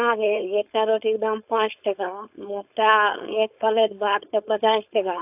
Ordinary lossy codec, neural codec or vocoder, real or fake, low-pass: AAC, 32 kbps; codec, 16 kHz, 4.8 kbps, FACodec; fake; 3.6 kHz